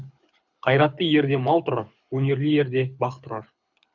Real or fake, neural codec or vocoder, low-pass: fake; codec, 24 kHz, 6 kbps, HILCodec; 7.2 kHz